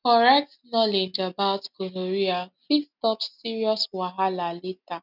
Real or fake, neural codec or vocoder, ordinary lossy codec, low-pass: real; none; none; 5.4 kHz